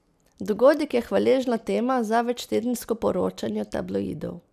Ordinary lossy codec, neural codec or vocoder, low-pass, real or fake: none; none; 14.4 kHz; real